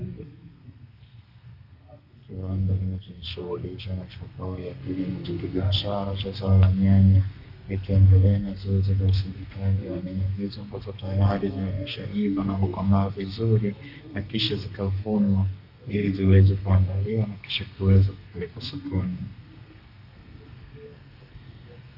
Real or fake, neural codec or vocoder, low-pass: fake; codec, 32 kHz, 1.9 kbps, SNAC; 5.4 kHz